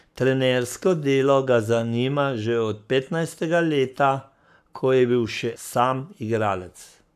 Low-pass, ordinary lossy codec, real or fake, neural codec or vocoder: 14.4 kHz; none; fake; codec, 44.1 kHz, 7.8 kbps, Pupu-Codec